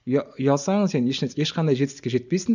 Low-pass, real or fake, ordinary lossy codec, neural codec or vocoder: 7.2 kHz; real; none; none